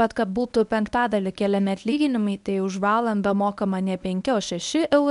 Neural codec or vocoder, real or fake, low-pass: codec, 24 kHz, 0.9 kbps, WavTokenizer, medium speech release version 1; fake; 10.8 kHz